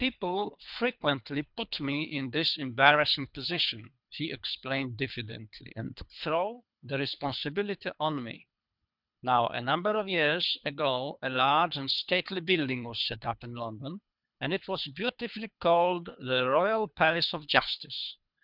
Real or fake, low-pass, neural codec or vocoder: fake; 5.4 kHz; codec, 24 kHz, 3 kbps, HILCodec